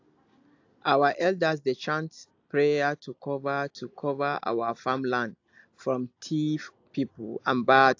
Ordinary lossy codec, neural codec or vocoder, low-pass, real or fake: AAC, 48 kbps; none; 7.2 kHz; real